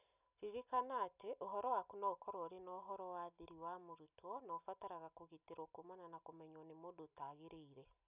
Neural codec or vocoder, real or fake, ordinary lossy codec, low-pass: none; real; none; 3.6 kHz